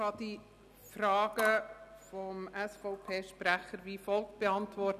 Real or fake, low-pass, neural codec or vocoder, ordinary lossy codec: real; 14.4 kHz; none; none